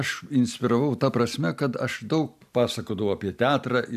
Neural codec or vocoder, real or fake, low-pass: none; real; 14.4 kHz